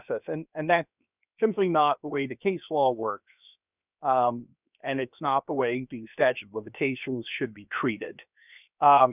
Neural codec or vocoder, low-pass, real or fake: codec, 16 kHz, 0.7 kbps, FocalCodec; 3.6 kHz; fake